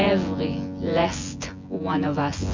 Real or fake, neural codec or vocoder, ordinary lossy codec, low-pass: fake; vocoder, 24 kHz, 100 mel bands, Vocos; MP3, 64 kbps; 7.2 kHz